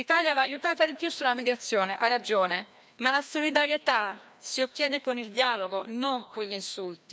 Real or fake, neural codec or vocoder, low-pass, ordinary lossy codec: fake; codec, 16 kHz, 1 kbps, FreqCodec, larger model; none; none